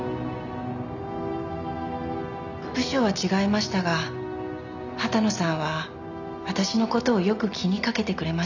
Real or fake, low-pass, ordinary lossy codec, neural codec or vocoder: real; 7.2 kHz; none; none